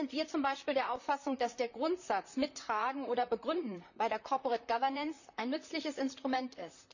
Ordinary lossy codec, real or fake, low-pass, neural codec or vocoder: none; fake; 7.2 kHz; vocoder, 44.1 kHz, 128 mel bands, Pupu-Vocoder